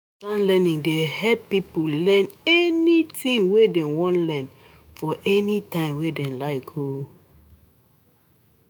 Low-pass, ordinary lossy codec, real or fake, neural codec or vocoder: none; none; fake; autoencoder, 48 kHz, 128 numbers a frame, DAC-VAE, trained on Japanese speech